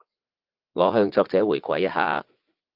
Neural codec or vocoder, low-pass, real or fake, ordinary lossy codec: vocoder, 44.1 kHz, 80 mel bands, Vocos; 5.4 kHz; fake; Opus, 32 kbps